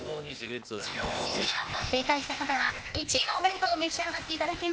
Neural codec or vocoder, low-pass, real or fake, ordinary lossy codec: codec, 16 kHz, 0.8 kbps, ZipCodec; none; fake; none